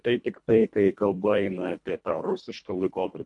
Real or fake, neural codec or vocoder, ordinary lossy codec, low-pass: fake; codec, 24 kHz, 1.5 kbps, HILCodec; MP3, 64 kbps; 10.8 kHz